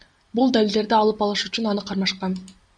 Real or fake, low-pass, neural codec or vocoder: real; 9.9 kHz; none